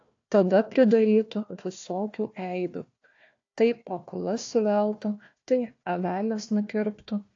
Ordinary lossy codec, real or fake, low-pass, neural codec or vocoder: AAC, 48 kbps; fake; 7.2 kHz; codec, 16 kHz, 1 kbps, FunCodec, trained on Chinese and English, 50 frames a second